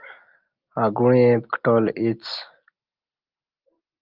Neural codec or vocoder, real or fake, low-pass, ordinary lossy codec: none; real; 5.4 kHz; Opus, 32 kbps